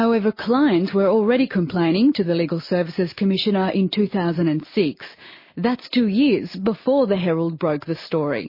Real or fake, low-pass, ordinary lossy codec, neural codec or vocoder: real; 5.4 kHz; MP3, 24 kbps; none